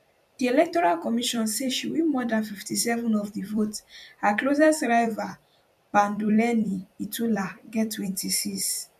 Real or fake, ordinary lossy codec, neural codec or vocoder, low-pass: fake; none; vocoder, 44.1 kHz, 128 mel bands every 256 samples, BigVGAN v2; 14.4 kHz